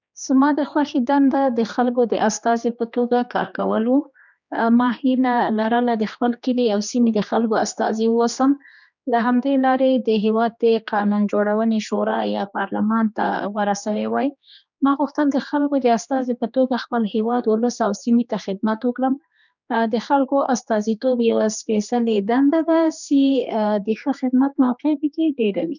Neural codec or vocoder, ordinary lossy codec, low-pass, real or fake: codec, 16 kHz, 2 kbps, X-Codec, HuBERT features, trained on general audio; none; 7.2 kHz; fake